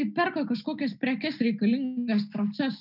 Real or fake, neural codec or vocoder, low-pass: real; none; 5.4 kHz